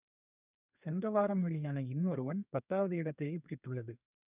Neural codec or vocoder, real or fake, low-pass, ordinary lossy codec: codec, 32 kHz, 1.9 kbps, SNAC; fake; 3.6 kHz; none